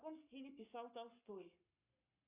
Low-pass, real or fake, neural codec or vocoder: 3.6 kHz; fake; codec, 44.1 kHz, 7.8 kbps, Pupu-Codec